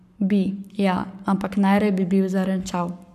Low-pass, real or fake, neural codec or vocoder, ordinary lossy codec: 14.4 kHz; fake; codec, 44.1 kHz, 7.8 kbps, Pupu-Codec; none